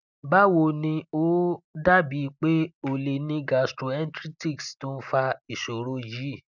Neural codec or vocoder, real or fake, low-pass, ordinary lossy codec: none; real; 7.2 kHz; none